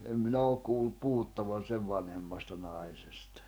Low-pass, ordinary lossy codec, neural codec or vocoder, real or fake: none; none; codec, 44.1 kHz, 7.8 kbps, DAC; fake